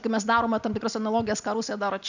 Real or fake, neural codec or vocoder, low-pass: real; none; 7.2 kHz